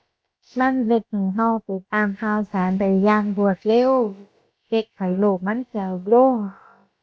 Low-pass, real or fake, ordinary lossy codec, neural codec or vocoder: none; fake; none; codec, 16 kHz, about 1 kbps, DyCAST, with the encoder's durations